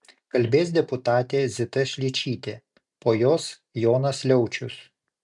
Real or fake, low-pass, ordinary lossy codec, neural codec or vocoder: real; 10.8 kHz; MP3, 96 kbps; none